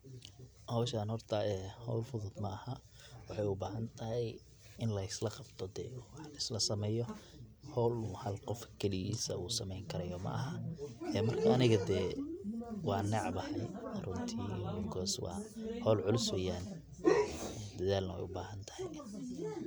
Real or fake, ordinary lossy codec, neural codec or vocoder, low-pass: real; none; none; none